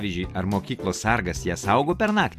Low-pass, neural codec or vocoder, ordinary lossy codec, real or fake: 14.4 kHz; none; AAC, 96 kbps; real